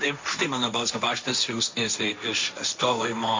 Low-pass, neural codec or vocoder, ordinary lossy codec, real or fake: 7.2 kHz; codec, 16 kHz, 1.1 kbps, Voila-Tokenizer; MP3, 48 kbps; fake